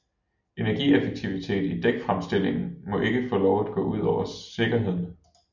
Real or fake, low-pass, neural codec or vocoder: real; 7.2 kHz; none